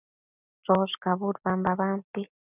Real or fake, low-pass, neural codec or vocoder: real; 3.6 kHz; none